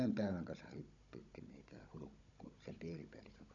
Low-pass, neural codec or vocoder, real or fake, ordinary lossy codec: 7.2 kHz; codec, 16 kHz, 16 kbps, FunCodec, trained on Chinese and English, 50 frames a second; fake; none